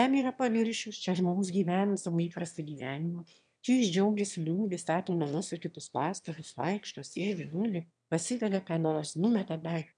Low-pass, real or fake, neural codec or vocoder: 9.9 kHz; fake; autoencoder, 22.05 kHz, a latent of 192 numbers a frame, VITS, trained on one speaker